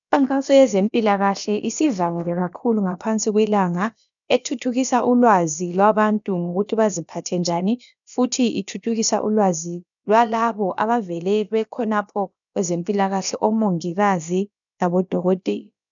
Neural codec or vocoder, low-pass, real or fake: codec, 16 kHz, about 1 kbps, DyCAST, with the encoder's durations; 7.2 kHz; fake